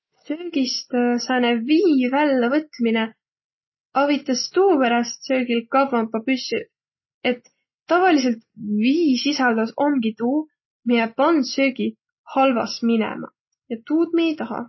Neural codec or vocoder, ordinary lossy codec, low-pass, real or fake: none; MP3, 24 kbps; 7.2 kHz; real